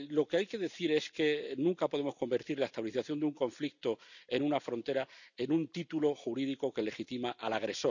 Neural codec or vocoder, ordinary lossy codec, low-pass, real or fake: none; none; 7.2 kHz; real